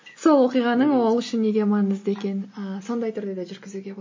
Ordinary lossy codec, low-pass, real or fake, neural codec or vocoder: MP3, 32 kbps; 7.2 kHz; real; none